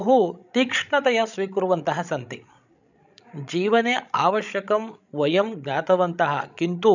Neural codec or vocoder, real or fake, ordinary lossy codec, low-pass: codec, 16 kHz, 16 kbps, FreqCodec, larger model; fake; none; 7.2 kHz